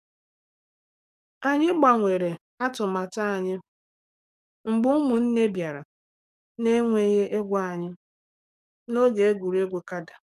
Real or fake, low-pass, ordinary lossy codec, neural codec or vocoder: fake; 14.4 kHz; none; codec, 44.1 kHz, 7.8 kbps, DAC